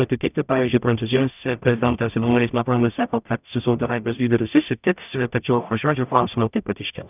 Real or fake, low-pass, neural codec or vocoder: fake; 3.6 kHz; codec, 44.1 kHz, 0.9 kbps, DAC